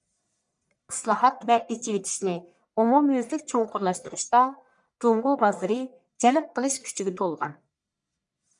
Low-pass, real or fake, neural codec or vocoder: 10.8 kHz; fake; codec, 44.1 kHz, 1.7 kbps, Pupu-Codec